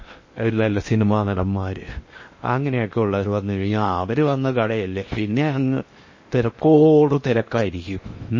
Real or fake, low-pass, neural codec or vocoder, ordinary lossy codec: fake; 7.2 kHz; codec, 16 kHz in and 24 kHz out, 0.8 kbps, FocalCodec, streaming, 65536 codes; MP3, 32 kbps